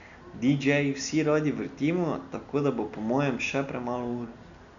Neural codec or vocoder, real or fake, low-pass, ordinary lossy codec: none; real; 7.2 kHz; none